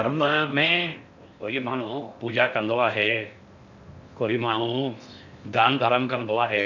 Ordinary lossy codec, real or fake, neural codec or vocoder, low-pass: none; fake; codec, 16 kHz in and 24 kHz out, 0.6 kbps, FocalCodec, streaming, 4096 codes; 7.2 kHz